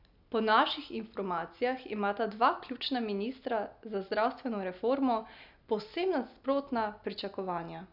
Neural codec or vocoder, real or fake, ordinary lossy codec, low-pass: none; real; none; 5.4 kHz